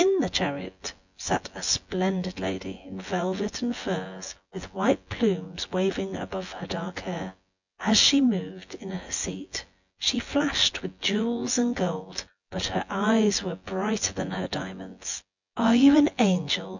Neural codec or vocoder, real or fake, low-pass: vocoder, 24 kHz, 100 mel bands, Vocos; fake; 7.2 kHz